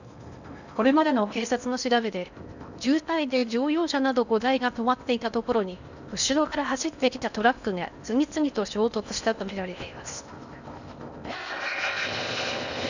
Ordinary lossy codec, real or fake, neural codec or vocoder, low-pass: none; fake; codec, 16 kHz in and 24 kHz out, 0.8 kbps, FocalCodec, streaming, 65536 codes; 7.2 kHz